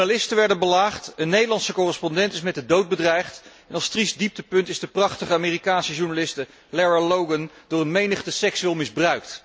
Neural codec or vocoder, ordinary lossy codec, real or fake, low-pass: none; none; real; none